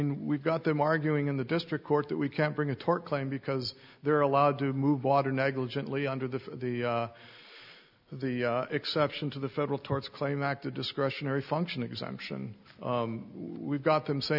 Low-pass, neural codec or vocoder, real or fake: 5.4 kHz; none; real